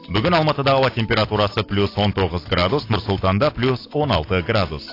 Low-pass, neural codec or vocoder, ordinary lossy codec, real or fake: 5.4 kHz; none; AAC, 32 kbps; real